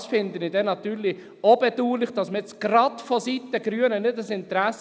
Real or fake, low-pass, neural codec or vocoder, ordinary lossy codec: real; none; none; none